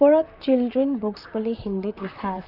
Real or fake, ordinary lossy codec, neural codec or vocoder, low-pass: fake; none; vocoder, 44.1 kHz, 128 mel bands, Pupu-Vocoder; 5.4 kHz